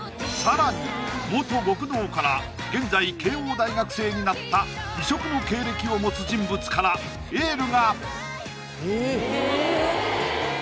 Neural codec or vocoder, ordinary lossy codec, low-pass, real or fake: none; none; none; real